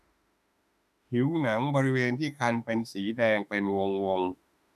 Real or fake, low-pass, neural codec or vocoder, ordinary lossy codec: fake; 14.4 kHz; autoencoder, 48 kHz, 32 numbers a frame, DAC-VAE, trained on Japanese speech; none